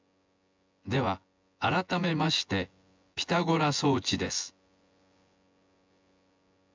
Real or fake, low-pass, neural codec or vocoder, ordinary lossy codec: fake; 7.2 kHz; vocoder, 24 kHz, 100 mel bands, Vocos; MP3, 64 kbps